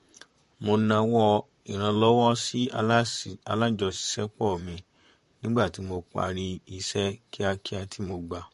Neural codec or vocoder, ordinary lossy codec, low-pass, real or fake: vocoder, 44.1 kHz, 128 mel bands, Pupu-Vocoder; MP3, 48 kbps; 14.4 kHz; fake